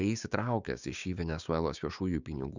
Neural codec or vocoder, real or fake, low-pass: codec, 16 kHz, 6 kbps, DAC; fake; 7.2 kHz